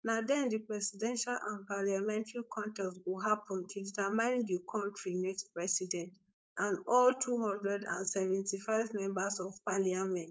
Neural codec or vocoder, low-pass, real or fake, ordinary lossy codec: codec, 16 kHz, 4.8 kbps, FACodec; none; fake; none